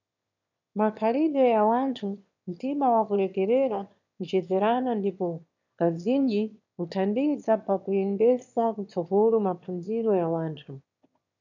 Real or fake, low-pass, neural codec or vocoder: fake; 7.2 kHz; autoencoder, 22.05 kHz, a latent of 192 numbers a frame, VITS, trained on one speaker